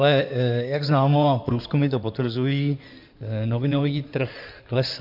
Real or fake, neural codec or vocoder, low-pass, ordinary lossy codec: fake; codec, 16 kHz in and 24 kHz out, 2.2 kbps, FireRedTTS-2 codec; 5.4 kHz; MP3, 48 kbps